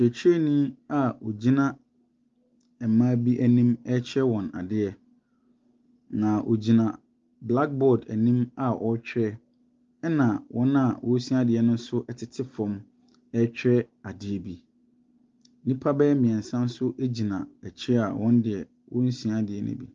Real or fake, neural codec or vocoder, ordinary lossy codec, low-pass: real; none; Opus, 32 kbps; 7.2 kHz